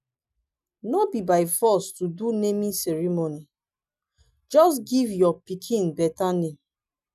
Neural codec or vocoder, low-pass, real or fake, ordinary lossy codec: none; 14.4 kHz; real; none